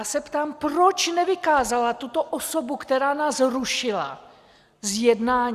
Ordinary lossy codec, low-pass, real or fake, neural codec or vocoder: Opus, 64 kbps; 14.4 kHz; real; none